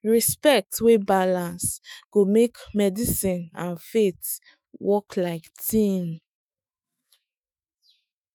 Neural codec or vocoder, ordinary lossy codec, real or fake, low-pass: autoencoder, 48 kHz, 128 numbers a frame, DAC-VAE, trained on Japanese speech; none; fake; none